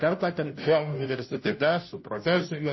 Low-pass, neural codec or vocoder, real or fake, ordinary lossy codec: 7.2 kHz; codec, 16 kHz, 0.5 kbps, FunCodec, trained on Chinese and English, 25 frames a second; fake; MP3, 24 kbps